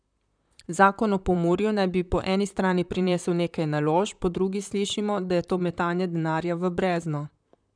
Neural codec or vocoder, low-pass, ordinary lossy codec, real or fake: vocoder, 44.1 kHz, 128 mel bands, Pupu-Vocoder; 9.9 kHz; none; fake